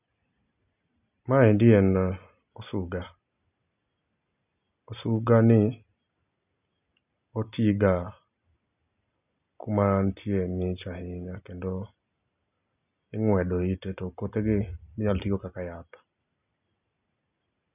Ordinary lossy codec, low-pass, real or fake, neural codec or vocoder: none; 3.6 kHz; real; none